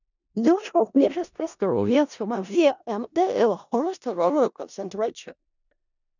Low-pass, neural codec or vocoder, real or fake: 7.2 kHz; codec, 16 kHz in and 24 kHz out, 0.4 kbps, LongCat-Audio-Codec, four codebook decoder; fake